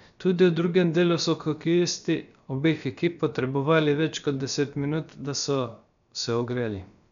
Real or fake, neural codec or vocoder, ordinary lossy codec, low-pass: fake; codec, 16 kHz, about 1 kbps, DyCAST, with the encoder's durations; none; 7.2 kHz